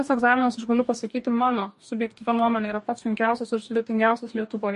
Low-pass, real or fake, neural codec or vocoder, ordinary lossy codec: 14.4 kHz; fake; codec, 44.1 kHz, 2.6 kbps, DAC; MP3, 48 kbps